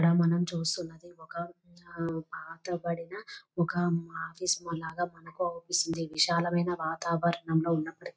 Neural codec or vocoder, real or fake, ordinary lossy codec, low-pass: none; real; none; none